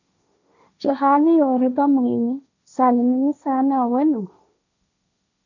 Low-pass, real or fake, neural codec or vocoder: 7.2 kHz; fake; codec, 16 kHz, 1.1 kbps, Voila-Tokenizer